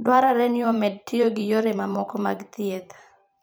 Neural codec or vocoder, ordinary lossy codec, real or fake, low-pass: vocoder, 44.1 kHz, 128 mel bands every 512 samples, BigVGAN v2; none; fake; none